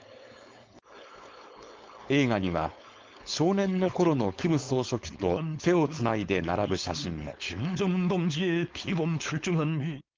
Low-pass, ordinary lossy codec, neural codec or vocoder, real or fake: 7.2 kHz; Opus, 32 kbps; codec, 16 kHz, 4.8 kbps, FACodec; fake